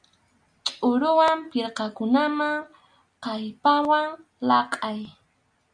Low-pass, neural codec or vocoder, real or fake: 9.9 kHz; none; real